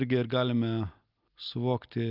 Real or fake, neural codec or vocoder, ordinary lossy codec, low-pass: real; none; Opus, 24 kbps; 5.4 kHz